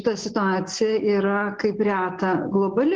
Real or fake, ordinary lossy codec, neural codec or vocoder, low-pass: real; Opus, 16 kbps; none; 7.2 kHz